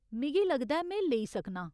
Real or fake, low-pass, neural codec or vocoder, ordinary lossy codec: real; none; none; none